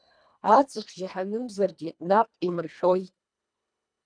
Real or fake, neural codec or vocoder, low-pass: fake; codec, 24 kHz, 1.5 kbps, HILCodec; 9.9 kHz